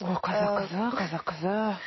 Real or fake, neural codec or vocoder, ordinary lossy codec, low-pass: real; none; MP3, 24 kbps; 7.2 kHz